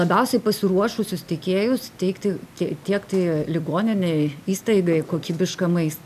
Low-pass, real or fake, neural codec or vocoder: 14.4 kHz; real; none